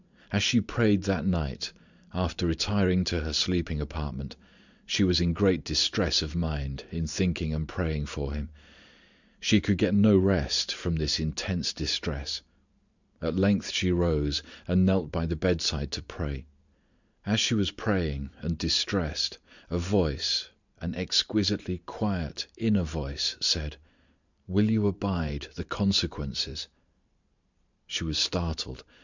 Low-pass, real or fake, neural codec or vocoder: 7.2 kHz; fake; vocoder, 44.1 kHz, 128 mel bands every 512 samples, BigVGAN v2